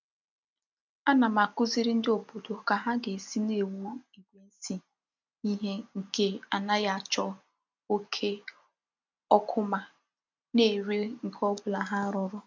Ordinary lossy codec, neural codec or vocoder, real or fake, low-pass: MP3, 64 kbps; none; real; 7.2 kHz